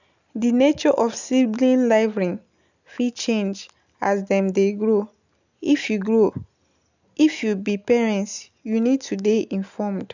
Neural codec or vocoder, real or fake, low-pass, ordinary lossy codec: none; real; 7.2 kHz; none